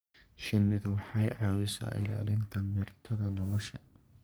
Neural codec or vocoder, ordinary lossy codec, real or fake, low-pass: codec, 44.1 kHz, 3.4 kbps, Pupu-Codec; none; fake; none